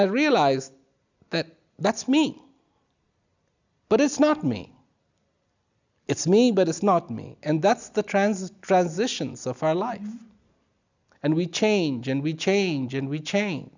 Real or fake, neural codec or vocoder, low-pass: real; none; 7.2 kHz